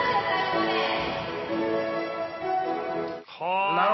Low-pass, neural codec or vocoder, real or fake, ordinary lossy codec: 7.2 kHz; none; real; MP3, 24 kbps